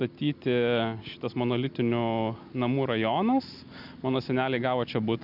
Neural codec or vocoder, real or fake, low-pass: none; real; 5.4 kHz